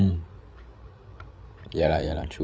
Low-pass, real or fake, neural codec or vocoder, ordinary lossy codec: none; fake; codec, 16 kHz, 8 kbps, FreqCodec, larger model; none